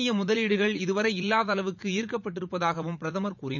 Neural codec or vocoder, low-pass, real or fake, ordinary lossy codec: vocoder, 44.1 kHz, 80 mel bands, Vocos; 7.2 kHz; fake; none